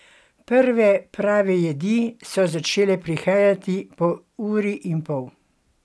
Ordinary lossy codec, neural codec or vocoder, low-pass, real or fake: none; none; none; real